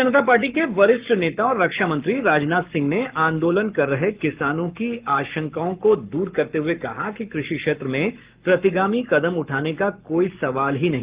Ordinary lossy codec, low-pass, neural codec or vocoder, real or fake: Opus, 32 kbps; 3.6 kHz; codec, 44.1 kHz, 7.8 kbps, Pupu-Codec; fake